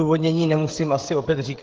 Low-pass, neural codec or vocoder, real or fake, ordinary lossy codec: 7.2 kHz; codec, 16 kHz, 16 kbps, FreqCodec, smaller model; fake; Opus, 16 kbps